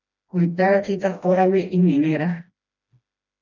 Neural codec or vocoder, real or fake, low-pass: codec, 16 kHz, 1 kbps, FreqCodec, smaller model; fake; 7.2 kHz